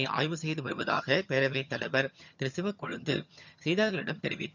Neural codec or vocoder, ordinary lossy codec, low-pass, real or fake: vocoder, 22.05 kHz, 80 mel bands, HiFi-GAN; none; 7.2 kHz; fake